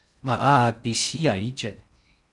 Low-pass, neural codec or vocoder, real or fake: 10.8 kHz; codec, 16 kHz in and 24 kHz out, 0.6 kbps, FocalCodec, streaming, 4096 codes; fake